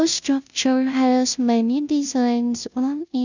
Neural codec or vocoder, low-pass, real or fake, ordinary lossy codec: codec, 16 kHz, 0.5 kbps, FunCodec, trained on Chinese and English, 25 frames a second; 7.2 kHz; fake; none